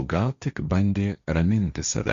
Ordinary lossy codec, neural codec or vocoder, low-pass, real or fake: Opus, 64 kbps; codec, 16 kHz, 1.1 kbps, Voila-Tokenizer; 7.2 kHz; fake